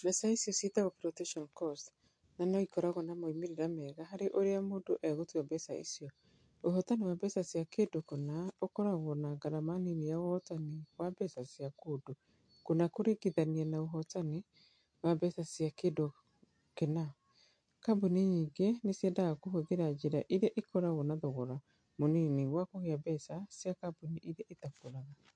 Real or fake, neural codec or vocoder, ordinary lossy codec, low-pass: real; none; MP3, 48 kbps; 9.9 kHz